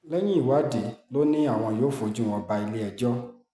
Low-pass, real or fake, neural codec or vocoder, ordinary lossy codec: none; real; none; none